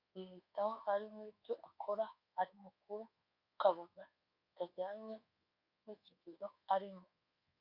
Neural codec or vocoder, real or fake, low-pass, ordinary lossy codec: codec, 24 kHz, 1.2 kbps, DualCodec; fake; 5.4 kHz; Opus, 64 kbps